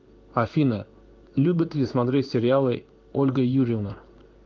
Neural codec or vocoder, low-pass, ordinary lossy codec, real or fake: codec, 16 kHz in and 24 kHz out, 1 kbps, XY-Tokenizer; 7.2 kHz; Opus, 32 kbps; fake